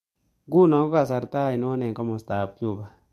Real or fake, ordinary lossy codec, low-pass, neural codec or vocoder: fake; MP3, 64 kbps; 14.4 kHz; codec, 44.1 kHz, 7.8 kbps, DAC